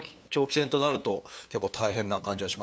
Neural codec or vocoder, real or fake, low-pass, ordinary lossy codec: codec, 16 kHz, 2 kbps, FunCodec, trained on LibriTTS, 25 frames a second; fake; none; none